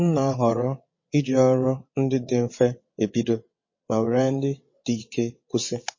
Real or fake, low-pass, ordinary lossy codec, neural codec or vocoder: fake; 7.2 kHz; MP3, 32 kbps; vocoder, 22.05 kHz, 80 mel bands, WaveNeXt